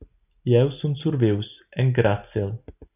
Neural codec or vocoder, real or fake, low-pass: none; real; 3.6 kHz